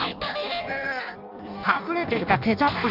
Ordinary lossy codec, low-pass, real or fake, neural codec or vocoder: AAC, 48 kbps; 5.4 kHz; fake; codec, 16 kHz in and 24 kHz out, 0.6 kbps, FireRedTTS-2 codec